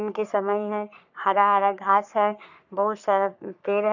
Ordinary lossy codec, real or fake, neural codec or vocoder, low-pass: none; fake; codec, 44.1 kHz, 3.4 kbps, Pupu-Codec; 7.2 kHz